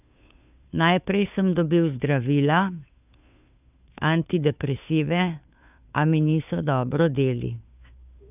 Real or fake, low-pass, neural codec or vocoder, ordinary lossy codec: fake; 3.6 kHz; codec, 16 kHz, 2 kbps, FunCodec, trained on Chinese and English, 25 frames a second; none